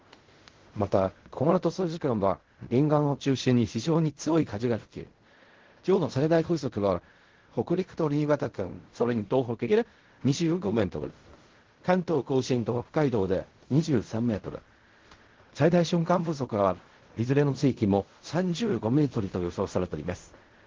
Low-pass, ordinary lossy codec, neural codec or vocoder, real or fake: 7.2 kHz; Opus, 16 kbps; codec, 16 kHz in and 24 kHz out, 0.4 kbps, LongCat-Audio-Codec, fine tuned four codebook decoder; fake